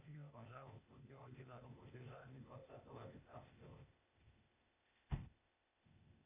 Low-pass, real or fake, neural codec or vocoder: 3.6 kHz; fake; codec, 16 kHz, 0.8 kbps, ZipCodec